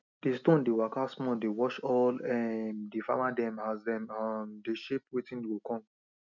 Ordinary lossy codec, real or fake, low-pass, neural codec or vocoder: none; real; 7.2 kHz; none